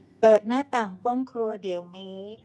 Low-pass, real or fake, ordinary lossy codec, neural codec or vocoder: none; fake; none; codec, 24 kHz, 0.9 kbps, WavTokenizer, medium music audio release